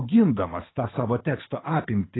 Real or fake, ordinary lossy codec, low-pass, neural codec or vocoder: fake; AAC, 16 kbps; 7.2 kHz; codec, 24 kHz, 6 kbps, HILCodec